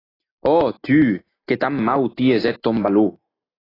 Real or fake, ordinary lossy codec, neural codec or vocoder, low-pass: real; AAC, 24 kbps; none; 5.4 kHz